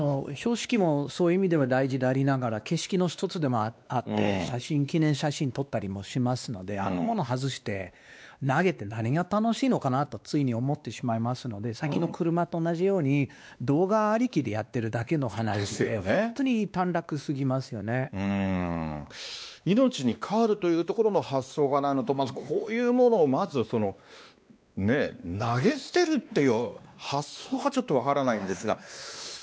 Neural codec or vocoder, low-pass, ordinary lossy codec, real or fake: codec, 16 kHz, 2 kbps, X-Codec, WavLM features, trained on Multilingual LibriSpeech; none; none; fake